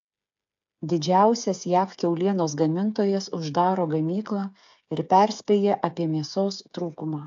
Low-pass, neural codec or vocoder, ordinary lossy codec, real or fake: 7.2 kHz; codec, 16 kHz, 8 kbps, FreqCodec, smaller model; AAC, 64 kbps; fake